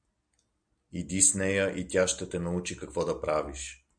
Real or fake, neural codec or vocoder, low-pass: real; none; 9.9 kHz